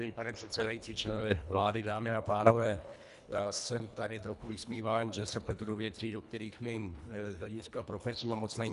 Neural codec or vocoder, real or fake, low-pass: codec, 24 kHz, 1.5 kbps, HILCodec; fake; 10.8 kHz